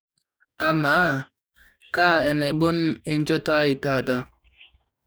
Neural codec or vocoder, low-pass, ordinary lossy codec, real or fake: codec, 44.1 kHz, 2.6 kbps, DAC; none; none; fake